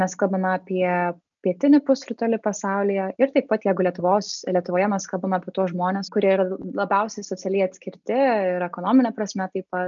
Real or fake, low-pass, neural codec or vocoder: real; 7.2 kHz; none